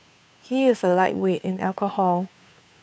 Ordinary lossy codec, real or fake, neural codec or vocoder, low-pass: none; fake; codec, 16 kHz, 2 kbps, FunCodec, trained on Chinese and English, 25 frames a second; none